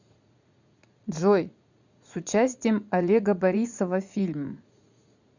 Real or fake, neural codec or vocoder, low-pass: fake; vocoder, 44.1 kHz, 80 mel bands, Vocos; 7.2 kHz